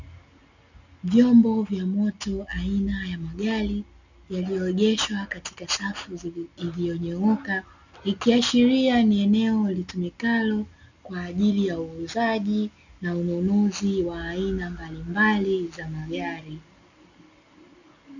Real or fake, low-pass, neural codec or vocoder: real; 7.2 kHz; none